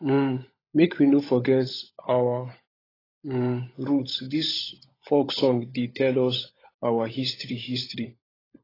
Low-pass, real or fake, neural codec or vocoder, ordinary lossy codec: 5.4 kHz; fake; codec, 16 kHz, 16 kbps, FunCodec, trained on LibriTTS, 50 frames a second; AAC, 24 kbps